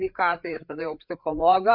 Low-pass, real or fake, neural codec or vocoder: 5.4 kHz; fake; codec, 16 kHz, 4 kbps, FreqCodec, larger model